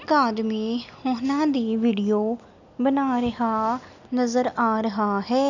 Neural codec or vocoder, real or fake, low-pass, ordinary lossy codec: none; real; 7.2 kHz; none